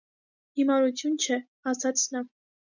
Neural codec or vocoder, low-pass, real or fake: none; 7.2 kHz; real